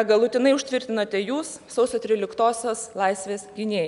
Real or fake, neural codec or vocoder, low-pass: real; none; 10.8 kHz